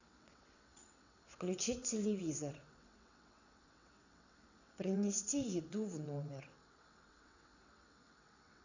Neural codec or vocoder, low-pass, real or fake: vocoder, 22.05 kHz, 80 mel bands, WaveNeXt; 7.2 kHz; fake